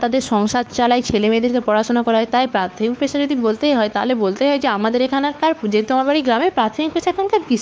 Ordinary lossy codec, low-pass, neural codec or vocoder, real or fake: none; none; codec, 16 kHz, 4 kbps, X-Codec, WavLM features, trained on Multilingual LibriSpeech; fake